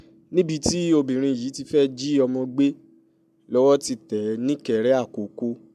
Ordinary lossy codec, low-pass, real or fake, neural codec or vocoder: MP3, 96 kbps; 14.4 kHz; real; none